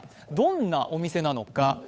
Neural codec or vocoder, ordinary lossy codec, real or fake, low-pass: codec, 16 kHz, 8 kbps, FunCodec, trained on Chinese and English, 25 frames a second; none; fake; none